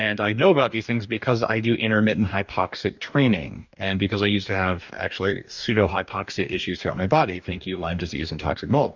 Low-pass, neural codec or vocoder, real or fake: 7.2 kHz; codec, 44.1 kHz, 2.6 kbps, DAC; fake